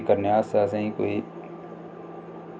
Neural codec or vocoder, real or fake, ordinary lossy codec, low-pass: none; real; none; none